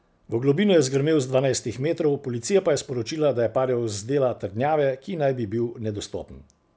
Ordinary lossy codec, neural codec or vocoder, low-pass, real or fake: none; none; none; real